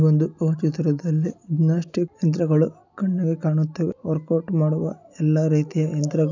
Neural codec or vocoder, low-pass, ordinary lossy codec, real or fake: none; 7.2 kHz; none; real